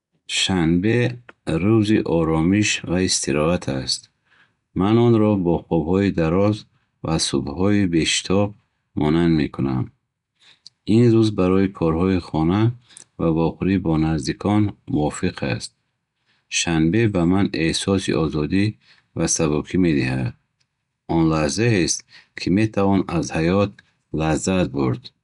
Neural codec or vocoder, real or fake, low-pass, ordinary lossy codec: none; real; 10.8 kHz; none